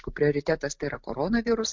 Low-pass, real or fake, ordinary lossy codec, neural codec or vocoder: 7.2 kHz; real; MP3, 64 kbps; none